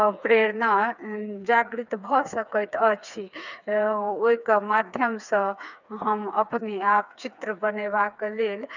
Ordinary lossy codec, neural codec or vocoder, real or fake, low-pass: none; codec, 16 kHz, 4 kbps, FreqCodec, smaller model; fake; 7.2 kHz